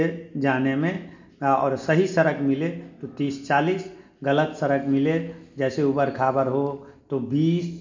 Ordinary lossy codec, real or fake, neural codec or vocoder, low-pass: MP3, 48 kbps; real; none; 7.2 kHz